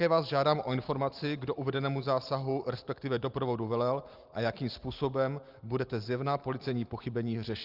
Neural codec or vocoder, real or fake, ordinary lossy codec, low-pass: none; real; Opus, 32 kbps; 5.4 kHz